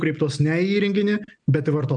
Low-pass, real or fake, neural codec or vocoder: 9.9 kHz; real; none